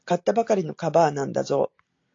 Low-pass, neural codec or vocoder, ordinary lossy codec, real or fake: 7.2 kHz; none; AAC, 48 kbps; real